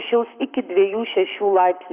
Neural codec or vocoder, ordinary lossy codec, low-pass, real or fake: codec, 44.1 kHz, 7.8 kbps, Pupu-Codec; Opus, 64 kbps; 3.6 kHz; fake